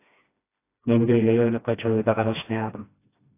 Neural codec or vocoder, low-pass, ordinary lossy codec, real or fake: codec, 16 kHz, 1 kbps, FreqCodec, smaller model; 3.6 kHz; AAC, 24 kbps; fake